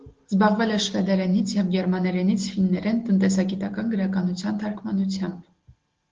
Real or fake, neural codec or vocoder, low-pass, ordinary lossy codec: real; none; 7.2 kHz; Opus, 16 kbps